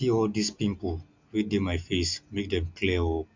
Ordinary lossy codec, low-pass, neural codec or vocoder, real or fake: MP3, 64 kbps; 7.2 kHz; none; real